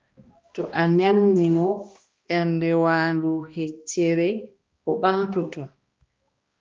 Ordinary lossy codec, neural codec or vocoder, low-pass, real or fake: Opus, 24 kbps; codec, 16 kHz, 1 kbps, X-Codec, HuBERT features, trained on balanced general audio; 7.2 kHz; fake